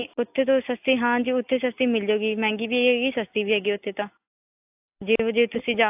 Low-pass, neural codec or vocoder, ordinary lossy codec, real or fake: 3.6 kHz; none; AAC, 32 kbps; real